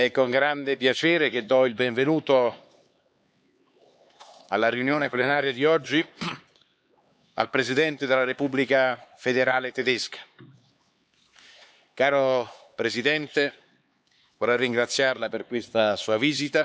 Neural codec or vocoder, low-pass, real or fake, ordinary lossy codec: codec, 16 kHz, 4 kbps, X-Codec, HuBERT features, trained on LibriSpeech; none; fake; none